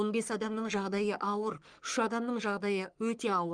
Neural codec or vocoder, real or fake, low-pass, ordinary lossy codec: codec, 44.1 kHz, 3.4 kbps, Pupu-Codec; fake; 9.9 kHz; Opus, 32 kbps